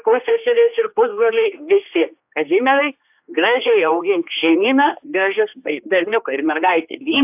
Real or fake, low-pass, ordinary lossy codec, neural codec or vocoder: fake; 3.6 kHz; AAC, 32 kbps; codec, 16 kHz, 2 kbps, X-Codec, HuBERT features, trained on general audio